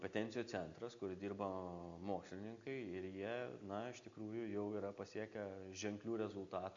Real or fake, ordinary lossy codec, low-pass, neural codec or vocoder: real; AAC, 64 kbps; 7.2 kHz; none